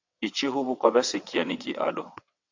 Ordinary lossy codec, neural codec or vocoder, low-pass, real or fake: AAC, 48 kbps; vocoder, 22.05 kHz, 80 mel bands, WaveNeXt; 7.2 kHz; fake